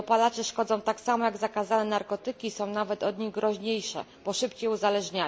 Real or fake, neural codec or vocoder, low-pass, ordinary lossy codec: real; none; none; none